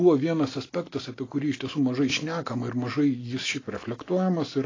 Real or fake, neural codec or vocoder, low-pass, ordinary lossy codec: real; none; 7.2 kHz; AAC, 32 kbps